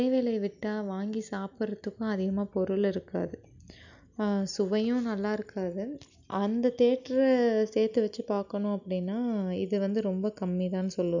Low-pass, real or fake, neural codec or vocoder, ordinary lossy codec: 7.2 kHz; real; none; none